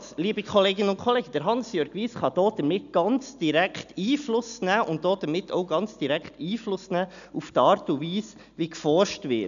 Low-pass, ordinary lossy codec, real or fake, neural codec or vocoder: 7.2 kHz; none; real; none